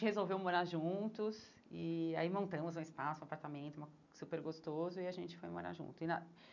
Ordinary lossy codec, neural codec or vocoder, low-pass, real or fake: none; none; 7.2 kHz; real